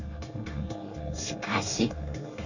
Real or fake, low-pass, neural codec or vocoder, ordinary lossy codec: fake; 7.2 kHz; codec, 24 kHz, 1 kbps, SNAC; none